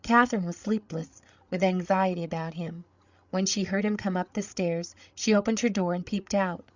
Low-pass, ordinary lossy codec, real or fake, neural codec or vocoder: 7.2 kHz; Opus, 64 kbps; fake; codec, 16 kHz, 8 kbps, FreqCodec, larger model